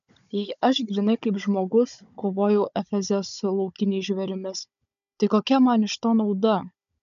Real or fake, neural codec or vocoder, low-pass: fake; codec, 16 kHz, 16 kbps, FunCodec, trained on Chinese and English, 50 frames a second; 7.2 kHz